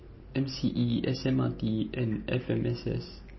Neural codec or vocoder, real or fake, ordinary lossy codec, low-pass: none; real; MP3, 24 kbps; 7.2 kHz